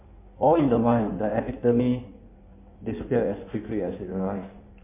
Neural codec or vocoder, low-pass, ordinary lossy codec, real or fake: codec, 16 kHz in and 24 kHz out, 1.1 kbps, FireRedTTS-2 codec; 3.6 kHz; MP3, 24 kbps; fake